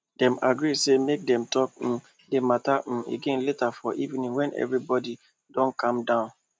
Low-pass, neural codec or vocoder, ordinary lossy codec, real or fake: none; none; none; real